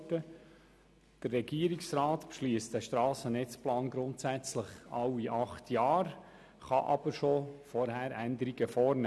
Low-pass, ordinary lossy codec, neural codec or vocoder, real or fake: none; none; none; real